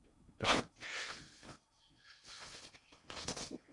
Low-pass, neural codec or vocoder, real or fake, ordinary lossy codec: 10.8 kHz; codec, 16 kHz in and 24 kHz out, 0.6 kbps, FocalCodec, streaming, 4096 codes; fake; MP3, 64 kbps